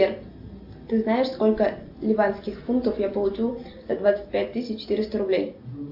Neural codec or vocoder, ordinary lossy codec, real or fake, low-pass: none; AAC, 48 kbps; real; 5.4 kHz